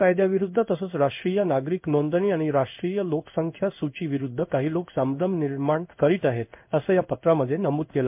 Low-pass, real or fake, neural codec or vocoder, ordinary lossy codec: 3.6 kHz; fake; codec, 16 kHz in and 24 kHz out, 1 kbps, XY-Tokenizer; MP3, 32 kbps